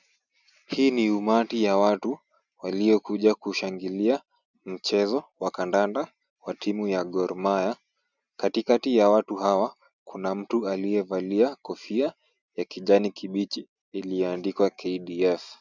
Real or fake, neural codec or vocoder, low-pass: real; none; 7.2 kHz